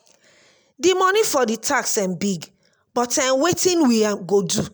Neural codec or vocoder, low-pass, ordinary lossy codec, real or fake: none; none; none; real